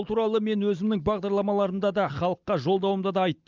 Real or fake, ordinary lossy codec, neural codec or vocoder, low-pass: real; Opus, 32 kbps; none; 7.2 kHz